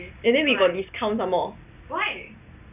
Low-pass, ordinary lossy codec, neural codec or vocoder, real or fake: 3.6 kHz; none; none; real